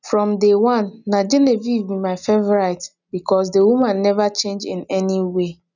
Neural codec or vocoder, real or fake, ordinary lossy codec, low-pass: none; real; none; 7.2 kHz